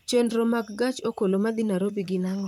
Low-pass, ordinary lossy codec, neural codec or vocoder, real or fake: 19.8 kHz; none; vocoder, 44.1 kHz, 128 mel bands, Pupu-Vocoder; fake